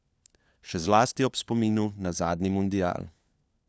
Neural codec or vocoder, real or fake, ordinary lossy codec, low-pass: codec, 16 kHz, 4 kbps, FunCodec, trained on LibriTTS, 50 frames a second; fake; none; none